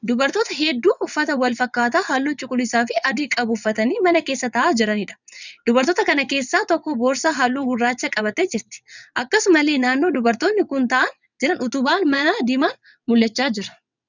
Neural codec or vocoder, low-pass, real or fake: vocoder, 22.05 kHz, 80 mel bands, WaveNeXt; 7.2 kHz; fake